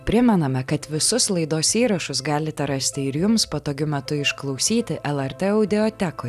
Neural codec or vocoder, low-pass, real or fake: none; 14.4 kHz; real